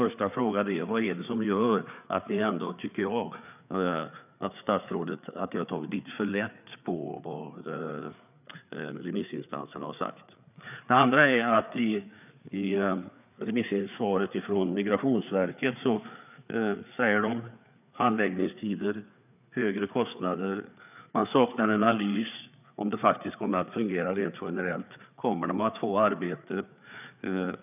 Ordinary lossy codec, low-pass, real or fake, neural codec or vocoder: none; 3.6 kHz; fake; codec, 16 kHz, 4 kbps, FreqCodec, larger model